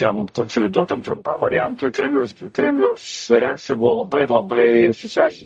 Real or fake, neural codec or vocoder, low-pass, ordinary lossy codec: fake; codec, 44.1 kHz, 0.9 kbps, DAC; 10.8 kHz; MP3, 48 kbps